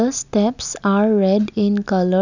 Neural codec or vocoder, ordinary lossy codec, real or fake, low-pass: none; none; real; 7.2 kHz